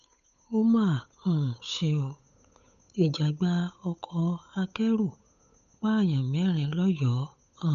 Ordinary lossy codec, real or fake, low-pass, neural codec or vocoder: none; fake; 7.2 kHz; codec, 16 kHz, 8 kbps, FunCodec, trained on Chinese and English, 25 frames a second